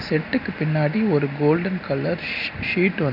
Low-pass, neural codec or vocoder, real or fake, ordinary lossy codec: 5.4 kHz; none; real; none